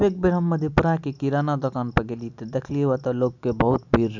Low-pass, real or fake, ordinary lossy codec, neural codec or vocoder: 7.2 kHz; real; none; none